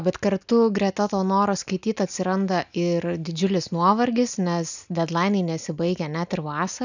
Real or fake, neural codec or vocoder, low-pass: real; none; 7.2 kHz